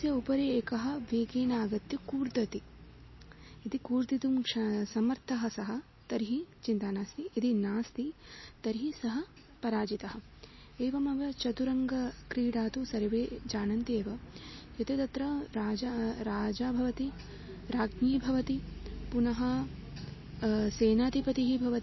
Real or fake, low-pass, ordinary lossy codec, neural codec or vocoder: real; 7.2 kHz; MP3, 24 kbps; none